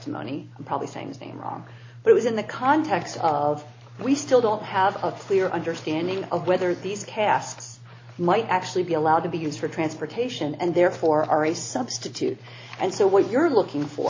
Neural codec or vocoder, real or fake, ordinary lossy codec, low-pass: none; real; AAC, 32 kbps; 7.2 kHz